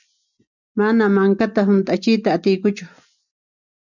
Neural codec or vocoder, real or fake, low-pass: none; real; 7.2 kHz